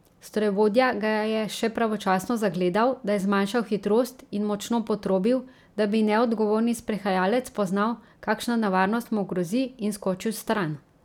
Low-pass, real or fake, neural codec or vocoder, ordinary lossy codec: 19.8 kHz; real; none; none